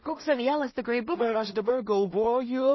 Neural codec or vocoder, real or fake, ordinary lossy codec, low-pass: codec, 16 kHz in and 24 kHz out, 0.4 kbps, LongCat-Audio-Codec, two codebook decoder; fake; MP3, 24 kbps; 7.2 kHz